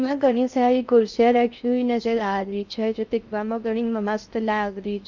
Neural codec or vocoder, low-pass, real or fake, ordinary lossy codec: codec, 16 kHz in and 24 kHz out, 0.6 kbps, FocalCodec, streaming, 4096 codes; 7.2 kHz; fake; none